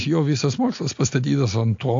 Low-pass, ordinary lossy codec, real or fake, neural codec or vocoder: 7.2 kHz; AAC, 48 kbps; real; none